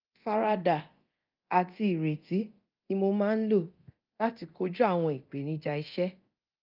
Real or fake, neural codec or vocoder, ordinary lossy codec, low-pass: fake; codec, 24 kHz, 0.9 kbps, DualCodec; Opus, 32 kbps; 5.4 kHz